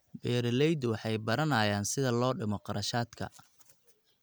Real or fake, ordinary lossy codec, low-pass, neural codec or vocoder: real; none; none; none